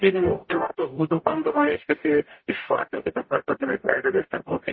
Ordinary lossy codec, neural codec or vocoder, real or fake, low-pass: MP3, 24 kbps; codec, 44.1 kHz, 0.9 kbps, DAC; fake; 7.2 kHz